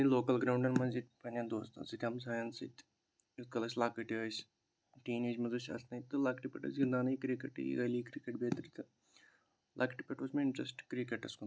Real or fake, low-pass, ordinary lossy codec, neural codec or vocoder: real; none; none; none